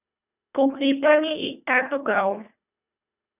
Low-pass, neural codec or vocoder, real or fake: 3.6 kHz; codec, 24 kHz, 1.5 kbps, HILCodec; fake